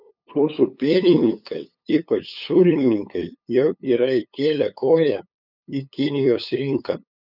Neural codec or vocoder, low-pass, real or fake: codec, 16 kHz, 8 kbps, FunCodec, trained on LibriTTS, 25 frames a second; 5.4 kHz; fake